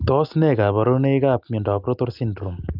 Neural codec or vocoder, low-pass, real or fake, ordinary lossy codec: none; 5.4 kHz; real; Opus, 24 kbps